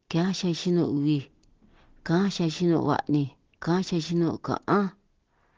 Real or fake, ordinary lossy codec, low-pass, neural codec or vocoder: real; Opus, 16 kbps; 7.2 kHz; none